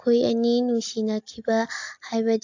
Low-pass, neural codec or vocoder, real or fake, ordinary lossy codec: 7.2 kHz; none; real; AAC, 48 kbps